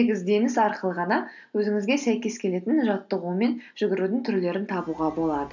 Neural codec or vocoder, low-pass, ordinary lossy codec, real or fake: none; 7.2 kHz; none; real